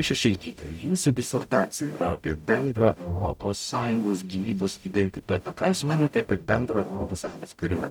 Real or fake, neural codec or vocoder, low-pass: fake; codec, 44.1 kHz, 0.9 kbps, DAC; 19.8 kHz